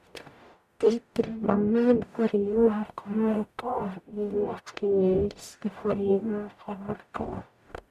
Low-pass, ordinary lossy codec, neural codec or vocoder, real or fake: 14.4 kHz; none; codec, 44.1 kHz, 0.9 kbps, DAC; fake